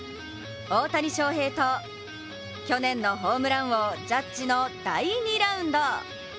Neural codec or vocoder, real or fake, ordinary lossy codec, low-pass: none; real; none; none